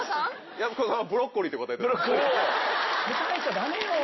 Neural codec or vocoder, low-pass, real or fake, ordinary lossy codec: none; 7.2 kHz; real; MP3, 24 kbps